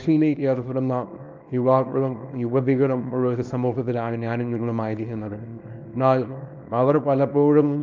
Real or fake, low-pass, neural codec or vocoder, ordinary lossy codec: fake; 7.2 kHz; codec, 24 kHz, 0.9 kbps, WavTokenizer, small release; Opus, 24 kbps